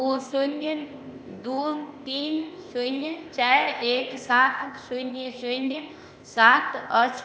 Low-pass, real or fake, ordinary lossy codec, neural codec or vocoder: none; fake; none; codec, 16 kHz, 0.8 kbps, ZipCodec